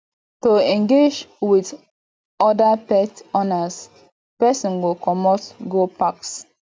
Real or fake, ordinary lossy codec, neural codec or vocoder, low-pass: real; none; none; none